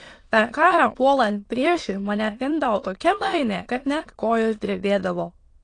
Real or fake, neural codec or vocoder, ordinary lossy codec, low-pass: fake; autoencoder, 22.05 kHz, a latent of 192 numbers a frame, VITS, trained on many speakers; AAC, 48 kbps; 9.9 kHz